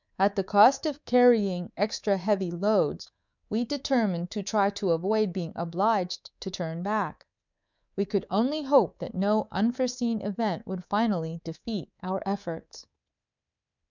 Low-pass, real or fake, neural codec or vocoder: 7.2 kHz; fake; codec, 24 kHz, 3.1 kbps, DualCodec